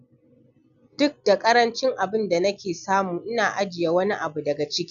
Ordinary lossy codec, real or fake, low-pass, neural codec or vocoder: none; real; 7.2 kHz; none